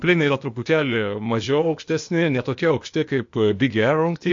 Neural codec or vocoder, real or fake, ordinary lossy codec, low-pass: codec, 16 kHz, 0.8 kbps, ZipCodec; fake; MP3, 48 kbps; 7.2 kHz